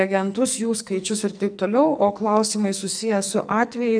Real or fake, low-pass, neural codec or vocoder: fake; 9.9 kHz; codec, 44.1 kHz, 2.6 kbps, SNAC